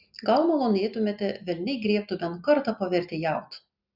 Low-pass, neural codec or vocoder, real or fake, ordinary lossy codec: 5.4 kHz; none; real; Opus, 64 kbps